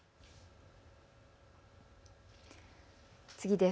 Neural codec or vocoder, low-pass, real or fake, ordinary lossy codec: none; none; real; none